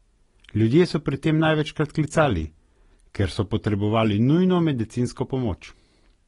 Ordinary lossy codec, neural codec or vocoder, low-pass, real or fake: AAC, 32 kbps; none; 10.8 kHz; real